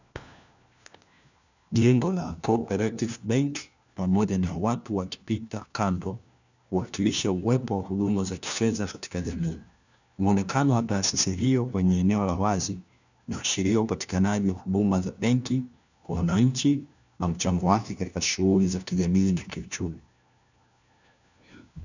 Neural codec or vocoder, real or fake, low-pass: codec, 16 kHz, 1 kbps, FunCodec, trained on LibriTTS, 50 frames a second; fake; 7.2 kHz